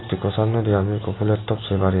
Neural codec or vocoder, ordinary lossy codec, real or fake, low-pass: none; AAC, 16 kbps; real; 7.2 kHz